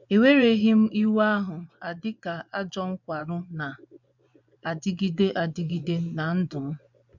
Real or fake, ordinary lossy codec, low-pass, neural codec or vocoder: fake; none; 7.2 kHz; vocoder, 24 kHz, 100 mel bands, Vocos